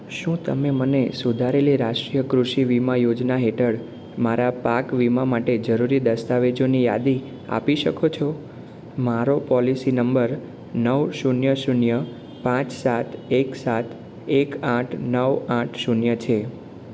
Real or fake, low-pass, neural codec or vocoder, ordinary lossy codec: real; none; none; none